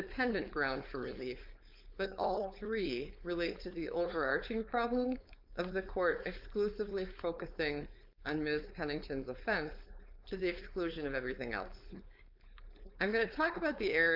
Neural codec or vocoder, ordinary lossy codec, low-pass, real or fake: codec, 16 kHz, 4.8 kbps, FACodec; MP3, 48 kbps; 5.4 kHz; fake